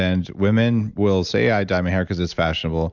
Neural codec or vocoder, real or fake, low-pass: none; real; 7.2 kHz